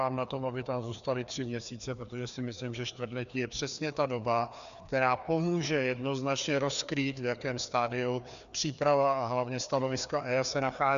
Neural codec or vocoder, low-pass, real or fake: codec, 16 kHz, 2 kbps, FreqCodec, larger model; 7.2 kHz; fake